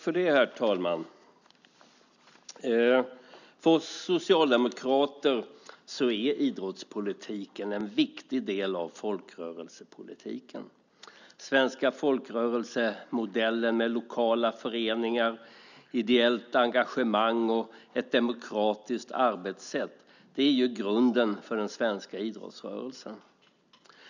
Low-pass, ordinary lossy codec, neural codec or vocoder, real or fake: 7.2 kHz; none; none; real